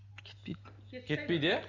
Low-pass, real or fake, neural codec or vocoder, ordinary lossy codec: 7.2 kHz; real; none; none